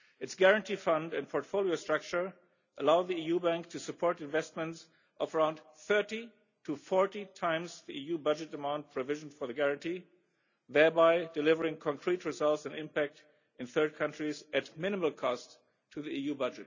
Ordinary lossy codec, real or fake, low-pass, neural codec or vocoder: none; real; 7.2 kHz; none